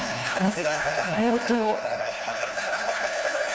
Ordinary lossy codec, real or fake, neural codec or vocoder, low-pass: none; fake; codec, 16 kHz, 1 kbps, FunCodec, trained on LibriTTS, 50 frames a second; none